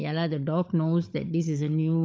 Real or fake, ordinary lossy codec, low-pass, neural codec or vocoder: fake; none; none; codec, 16 kHz, 16 kbps, FunCodec, trained on LibriTTS, 50 frames a second